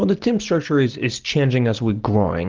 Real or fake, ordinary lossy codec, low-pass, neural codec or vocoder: real; Opus, 16 kbps; 7.2 kHz; none